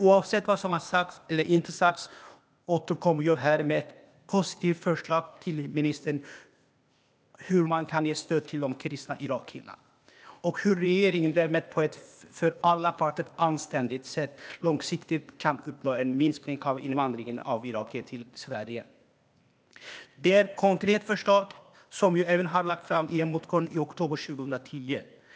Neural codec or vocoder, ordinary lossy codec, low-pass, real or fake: codec, 16 kHz, 0.8 kbps, ZipCodec; none; none; fake